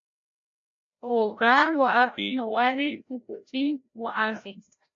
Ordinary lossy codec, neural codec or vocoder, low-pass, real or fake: MP3, 48 kbps; codec, 16 kHz, 0.5 kbps, FreqCodec, larger model; 7.2 kHz; fake